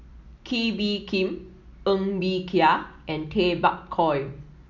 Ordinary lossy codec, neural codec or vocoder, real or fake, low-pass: none; none; real; 7.2 kHz